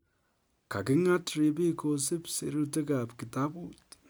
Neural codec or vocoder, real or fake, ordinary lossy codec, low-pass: none; real; none; none